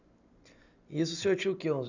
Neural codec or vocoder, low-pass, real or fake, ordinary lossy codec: none; 7.2 kHz; real; none